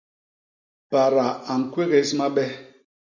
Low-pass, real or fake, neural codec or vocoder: 7.2 kHz; real; none